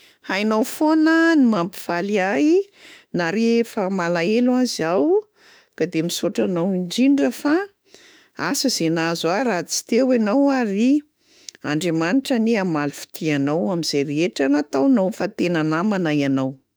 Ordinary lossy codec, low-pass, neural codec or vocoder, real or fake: none; none; autoencoder, 48 kHz, 32 numbers a frame, DAC-VAE, trained on Japanese speech; fake